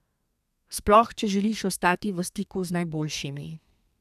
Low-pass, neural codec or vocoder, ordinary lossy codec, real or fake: 14.4 kHz; codec, 32 kHz, 1.9 kbps, SNAC; none; fake